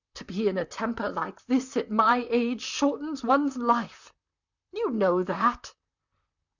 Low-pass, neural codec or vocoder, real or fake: 7.2 kHz; vocoder, 44.1 kHz, 128 mel bands, Pupu-Vocoder; fake